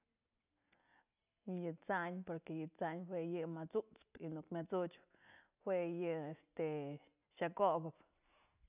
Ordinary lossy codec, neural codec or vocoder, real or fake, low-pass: none; none; real; 3.6 kHz